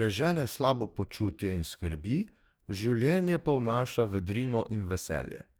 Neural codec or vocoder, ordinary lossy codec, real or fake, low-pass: codec, 44.1 kHz, 2.6 kbps, DAC; none; fake; none